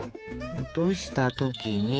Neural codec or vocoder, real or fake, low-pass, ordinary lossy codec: codec, 16 kHz, 4 kbps, X-Codec, HuBERT features, trained on general audio; fake; none; none